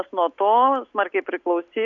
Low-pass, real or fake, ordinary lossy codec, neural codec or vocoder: 7.2 kHz; real; MP3, 96 kbps; none